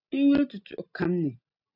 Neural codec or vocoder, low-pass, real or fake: none; 5.4 kHz; real